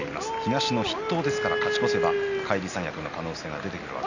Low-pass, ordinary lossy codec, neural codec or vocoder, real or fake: 7.2 kHz; none; none; real